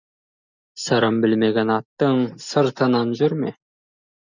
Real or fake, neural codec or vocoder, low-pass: real; none; 7.2 kHz